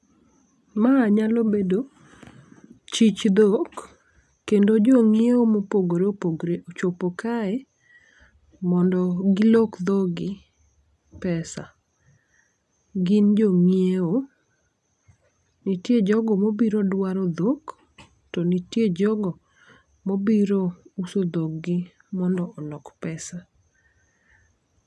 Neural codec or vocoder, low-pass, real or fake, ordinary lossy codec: none; none; real; none